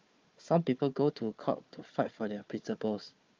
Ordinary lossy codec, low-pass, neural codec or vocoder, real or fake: Opus, 24 kbps; 7.2 kHz; codec, 16 kHz, 4 kbps, FunCodec, trained on Chinese and English, 50 frames a second; fake